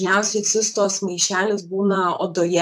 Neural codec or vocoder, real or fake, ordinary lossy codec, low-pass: vocoder, 44.1 kHz, 128 mel bands, Pupu-Vocoder; fake; AAC, 96 kbps; 14.4 kHz